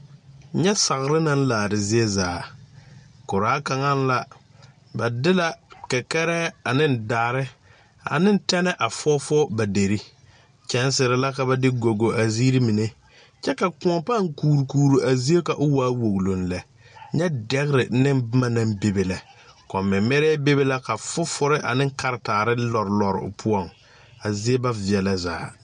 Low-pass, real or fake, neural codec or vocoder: 9.9 kHz; real; none